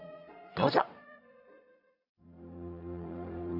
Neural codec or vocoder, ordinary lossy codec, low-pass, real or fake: vocoder, 22.05 kHz, 80 mel bands, Vocos; none; 5.4 kHz; fake